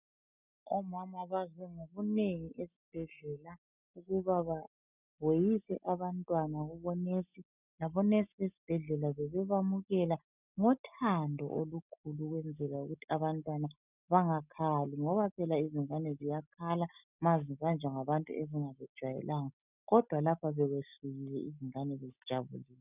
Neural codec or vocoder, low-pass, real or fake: none; 3.6 kHz; real